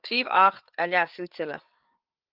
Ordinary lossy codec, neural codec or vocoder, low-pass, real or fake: Opus, 24 kbps; codec, 16 kHz, 8 kbps, FreqCodec, larger model; 5.4 kHz; fake